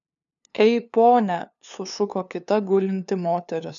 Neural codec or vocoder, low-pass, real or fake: codec, 16 kHz, 2 kbps, FunCodec, trained on LibriTTS, 25 frames a second; 7.2 kHz; fake